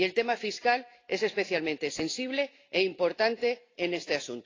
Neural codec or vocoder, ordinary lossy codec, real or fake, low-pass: none; AAC, 32 kbps; real; 7.2 kHz